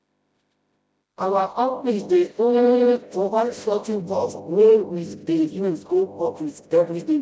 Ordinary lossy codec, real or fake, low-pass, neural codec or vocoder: none; fake; none; codec, 16 kHz, 0.5 kbps, FreqCodec, smaller model